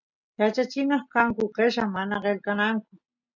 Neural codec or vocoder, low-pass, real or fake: none; 7.2 kHz; real